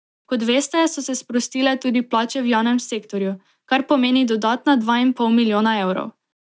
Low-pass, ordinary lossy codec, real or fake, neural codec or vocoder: none; none; real; none